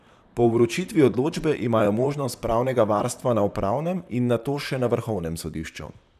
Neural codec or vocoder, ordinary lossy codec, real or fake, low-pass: vocoder, 44.1 kHz, 128 mel bands, Pupu-Vocoder; none; fake; 14.4 kHz